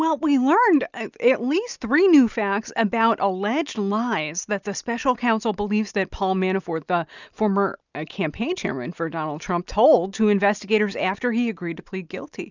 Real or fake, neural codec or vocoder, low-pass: real; none; 7.2 kHz